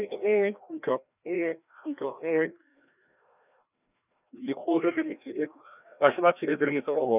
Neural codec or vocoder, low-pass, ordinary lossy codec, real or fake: codec, 16 kHz, 1 kbps, FreqCodec, larger model; 3.6 kHz; none; fake